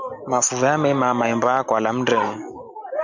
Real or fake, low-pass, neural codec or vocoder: real; 7.2 kHz; none